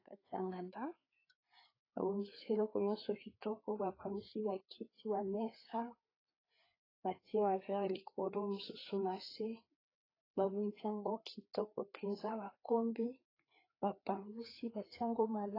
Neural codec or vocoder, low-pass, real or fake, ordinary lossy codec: codec, 16 kHz, 4 kbps, FreqCodec, larger model; 5.4 kHz; fake; AAC, 24 kbps